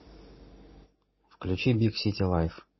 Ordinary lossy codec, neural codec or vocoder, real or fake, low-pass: MP3, 24 kbps; none; real; 7.2 kHz